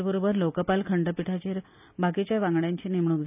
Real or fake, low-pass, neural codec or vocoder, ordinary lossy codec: real; 3.6 kHz; none; none